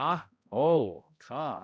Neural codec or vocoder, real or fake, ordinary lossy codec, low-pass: codec, 16 kHz, 0.5 kbps, X-Codec, HuBERT features, trained on balanced general audio; fake; none; none